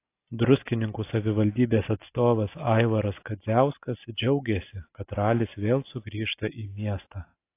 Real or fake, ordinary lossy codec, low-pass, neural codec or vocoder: real; AAC, 24 kbps; 3.6 kHz; none